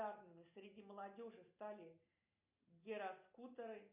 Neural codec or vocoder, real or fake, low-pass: none; real; 3.6 kHz